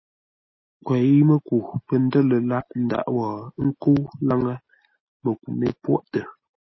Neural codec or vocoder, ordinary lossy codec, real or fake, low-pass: none; MP3, 24 kbps; real; 7.2 kHz